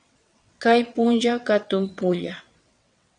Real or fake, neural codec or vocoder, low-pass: fake; vocoder, 22.05 kHz, 80 mel bands, WaveNeXt; 9.9 kHz